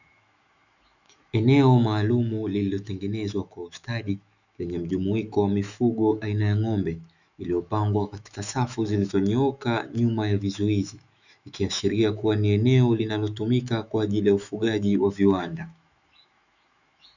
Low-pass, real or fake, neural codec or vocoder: 7.2 kHz; fake; autoencoder, 48 kHz, 128 numbers a frame, DAC-VAE, trained on Japanese speech